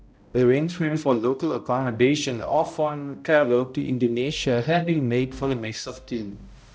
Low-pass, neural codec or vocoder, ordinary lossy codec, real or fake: none; codec, 16 kHz, 0.5 kbps, X-Codec, HuBERT features, trained on balanced general audio; none; fake